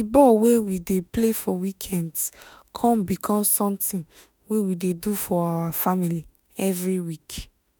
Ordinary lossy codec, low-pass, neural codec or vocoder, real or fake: none; none; autoencoder, 48 kHz, 32 numbers a frame, DAC-VAE, trained on Japanese speech; fake